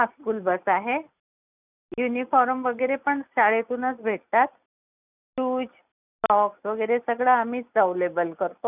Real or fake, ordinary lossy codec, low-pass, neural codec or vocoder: real; none; 3.6 kHz; none